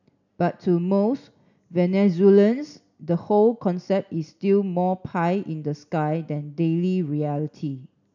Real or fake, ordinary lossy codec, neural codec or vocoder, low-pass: real; none; none; 7.2 kHz